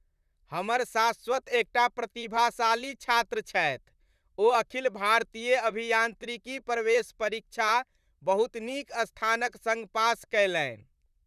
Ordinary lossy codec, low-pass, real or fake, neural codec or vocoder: none; 14.4 kHz; fake; vocoder, 44.1 kHz, 128 mel bands, Pupu-Vocoder